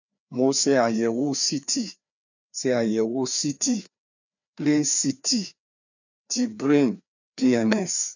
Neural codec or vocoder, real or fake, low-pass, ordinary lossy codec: codec, 16 kHz, 2 kbps, FreqCodec, larger model; fake; 7.2 kHz; none